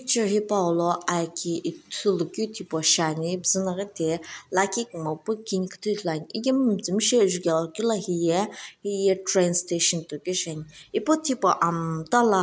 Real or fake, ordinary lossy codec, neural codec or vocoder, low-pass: real; none; none; none